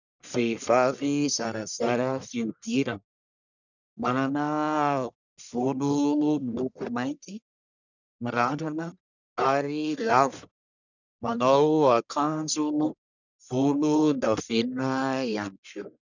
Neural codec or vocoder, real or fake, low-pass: codec, 44.1 kHz, 1.7 kbps, Pupu-Codec; fake; 7.2 kHz